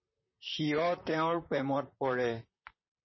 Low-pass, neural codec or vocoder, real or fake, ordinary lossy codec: 7.2 kHz; vocoder, 44.1 kHz, 128 mel bands, Pupu-Vocoder; fake; MP3, 24 kbps